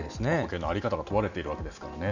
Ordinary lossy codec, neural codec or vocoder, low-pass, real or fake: none; none; 7.2 kHz; real